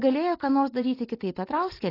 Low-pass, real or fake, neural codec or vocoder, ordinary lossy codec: 5.4 kHz; real; none; AAC, 24 kbps